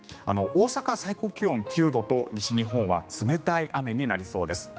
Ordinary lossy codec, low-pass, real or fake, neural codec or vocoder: none; none; fake; codec, 16 kHz, 2 kbps, X-Codec, HuBERT features, trained on general audio